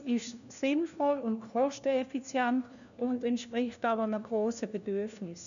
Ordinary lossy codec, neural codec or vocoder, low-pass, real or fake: none; codec, 16 kHz, 1 kbps, FunCodec, trained on LibriTTS, 50 frames a second; 7.2 kHz; fake